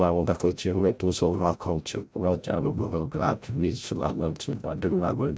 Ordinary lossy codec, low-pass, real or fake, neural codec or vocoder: none; none; fake; codec, 16 kHz, 0.5 kbps, FreqCodec, larger model